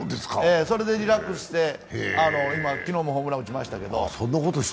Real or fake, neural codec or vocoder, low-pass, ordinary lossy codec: real; none; none; none